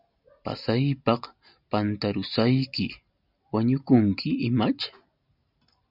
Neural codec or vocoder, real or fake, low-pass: none; real; 5.4 kHz